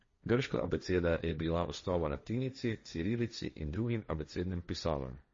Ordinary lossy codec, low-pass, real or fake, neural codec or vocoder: MP3, 32 kbps; 7.2 kHz; fake; codec, 16 kHz, 1.1 kbps, Voila-Tokenizer